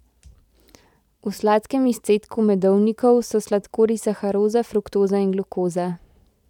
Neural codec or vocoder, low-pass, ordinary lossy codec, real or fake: vocoder, 44.1 kHz, 128 mel bands every 512 samples, BigVGAN v2; 19.8 kHz; none; fake